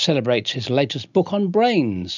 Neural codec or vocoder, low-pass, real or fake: none; 7.2 kHz; real